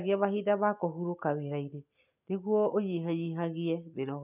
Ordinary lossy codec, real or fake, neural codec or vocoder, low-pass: none; real; none; 3.6 kHz